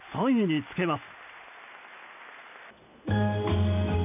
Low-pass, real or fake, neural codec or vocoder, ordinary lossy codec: 3.6 kHz; real; none; none